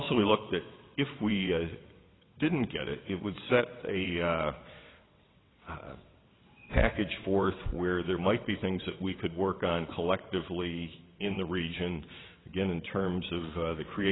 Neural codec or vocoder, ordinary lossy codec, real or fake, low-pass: vocoder, 22.05 kHz, 80 mel bands, WaveNeXt; AAC, 16 kbps; fake; 7.2 kHz